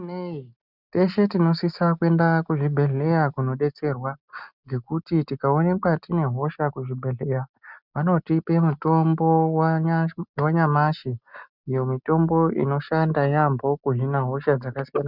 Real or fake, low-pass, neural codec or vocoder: real; 5.4 kHz; none